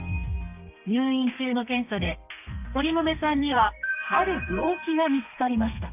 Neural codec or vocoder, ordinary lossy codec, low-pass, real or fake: codec, 32 kHz, 1.9 kbps, SNAC; none; 3.6 kHz; fake